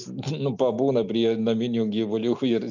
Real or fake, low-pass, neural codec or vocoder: real; 7.2 kHz; none